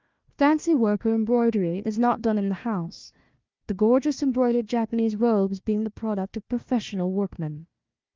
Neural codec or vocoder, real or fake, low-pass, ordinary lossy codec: codec, 16 kHz, 1 kbps, FunCodec, trained on Chinese and English, 50 frames a second; fake; 7.2 kHz; Opus, 32 kbps